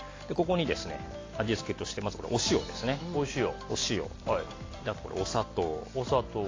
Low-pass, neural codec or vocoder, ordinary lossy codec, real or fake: 7.2 kHz; none; AAC, 32 kbps; real